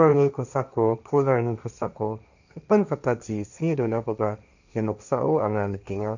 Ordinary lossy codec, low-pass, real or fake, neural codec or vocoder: none; 7.2 kHz; fake; codec, 16 kHz, 1.1 kbps, Voila-Tokenizer